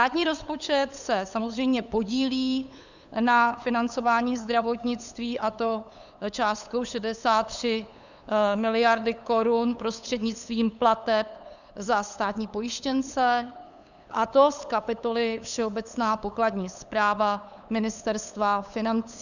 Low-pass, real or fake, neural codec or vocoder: 7.2 kHz; fake; codec, 16 kHz, 8 kbps, FunCodec, trained on LibriTTS, 25 frames a second